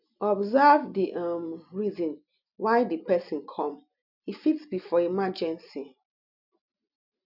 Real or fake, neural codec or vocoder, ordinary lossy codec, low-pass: real; none; none; 5.4 kHz